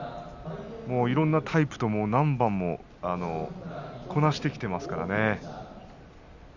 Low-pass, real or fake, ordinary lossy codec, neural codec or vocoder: 7.2 kHz; real; none; none